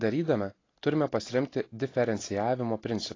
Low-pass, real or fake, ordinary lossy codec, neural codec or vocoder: 7.2 kHz; real; AAC, 32 kbps; none